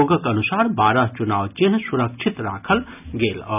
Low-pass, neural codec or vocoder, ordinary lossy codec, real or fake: 3.6 kHz; none; none; real